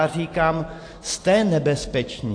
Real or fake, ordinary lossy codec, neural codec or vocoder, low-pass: real; AAC, 48 kbps; none; 9.9 kHz